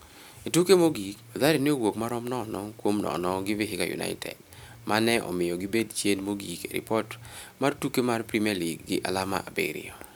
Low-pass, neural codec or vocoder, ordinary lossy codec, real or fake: none; none; none; real